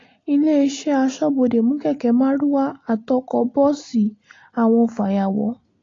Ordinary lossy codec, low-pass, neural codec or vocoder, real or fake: AAC, 32 kbps; 7.2 kHz; none; real